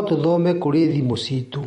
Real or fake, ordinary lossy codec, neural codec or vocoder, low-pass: fake; MP3, 48 kbps; vocoder, 44.1 kHz, 128 mel bands every 256 samples, BigVGAN v2; 19.8 kHz